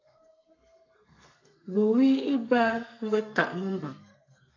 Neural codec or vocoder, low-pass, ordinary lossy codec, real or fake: codec, 32 kHz, 1.9 kbps, SNAC; 7.2 kHz; AAC, 48 kbps; fake